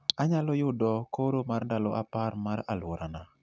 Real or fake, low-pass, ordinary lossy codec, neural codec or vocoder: real; none; none; none